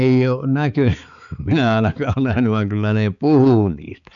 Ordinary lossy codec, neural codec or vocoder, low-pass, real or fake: none; codec, 16 kHz, 4 kbps, X-Codec, HuBERT features, trained on balanced general audio; 7.2 kHz; fake